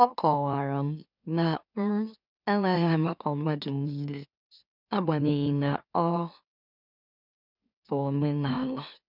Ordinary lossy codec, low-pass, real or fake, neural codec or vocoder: none; 5.4 kHz; fake; autoencoder, 44.1 kHz, a latent of 192 numbers a frame, MeloTTS